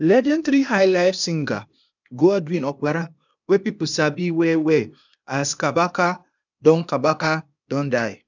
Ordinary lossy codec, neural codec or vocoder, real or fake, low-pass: none; codec, 16 kHz, 0.8 kbps, ZipCodec; fake; 7.2 kHz